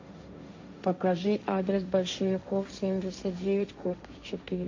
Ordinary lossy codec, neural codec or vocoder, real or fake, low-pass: MP3, 48 kbps; codec, 16 kHz, 1.1 kbps, Voila-Tokenizer; fake; 7.2 kHz